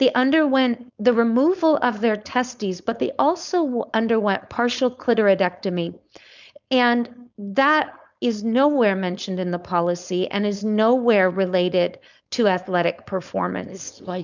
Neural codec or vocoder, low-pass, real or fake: codec, 16 kHz, 4.8 kbps, FACodec; 7.2 kHz; fake